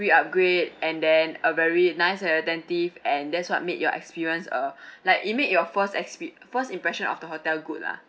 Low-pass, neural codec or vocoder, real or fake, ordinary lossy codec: none; none; real; none